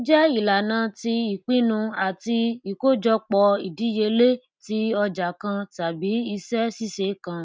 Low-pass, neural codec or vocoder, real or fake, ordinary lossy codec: none; none; real; none